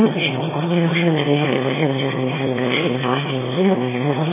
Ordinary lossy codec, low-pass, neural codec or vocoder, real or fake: MP3, 16 kbps; 3.6 kHz; autoencoder, 22.05 kHz, a latent of 192 numbers a frame, VITS, trained on one speaker; fake